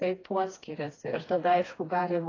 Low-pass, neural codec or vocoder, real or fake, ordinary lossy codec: 7.2 kHz; codec, 16 kHz, 2 kbps, FreqCodec, smaller model; fake; AAC, 32 kbps